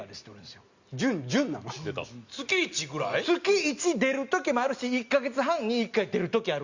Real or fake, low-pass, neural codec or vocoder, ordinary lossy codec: real; 7.2 kHz; none; Opus, 64 kbps